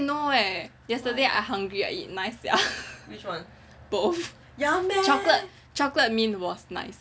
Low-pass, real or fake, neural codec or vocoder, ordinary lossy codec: none; real; none; none